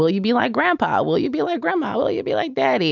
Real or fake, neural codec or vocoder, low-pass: real; none; 7.2 kHz